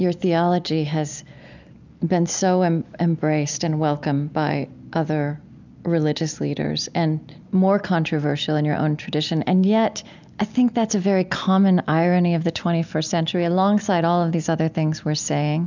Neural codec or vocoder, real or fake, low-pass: none; real; 7.2 kHz